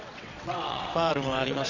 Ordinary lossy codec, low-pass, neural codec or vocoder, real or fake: none; 7.2 kHz; vocoder, 22.05 kHz, 80 mel bands, WaveNeXt; fake